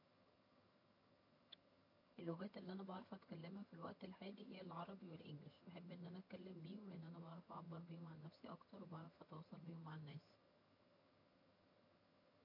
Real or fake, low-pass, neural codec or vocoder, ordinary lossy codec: fake; 5.4 kHz; vocoder, 22.05 kHz, 80 mel bands, HiFi-GAN; MP3, 32 kbps